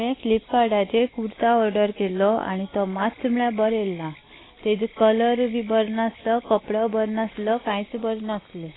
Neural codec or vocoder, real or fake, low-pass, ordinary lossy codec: none; real; 7.2 kHz; AAC, 16 kbps